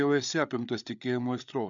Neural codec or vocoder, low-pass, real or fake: codec, 16 kHz, 8 kbps, FreqCodec, larger model; 7.2 kHz; fake